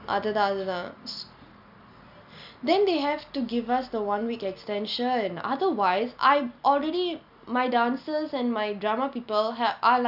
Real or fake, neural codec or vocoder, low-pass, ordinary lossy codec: real; none; 5.4 kHz; none